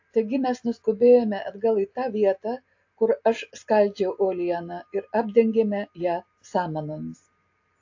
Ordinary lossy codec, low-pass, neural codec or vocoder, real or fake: AAC, 48 kbps; 7.2 kHz; none; real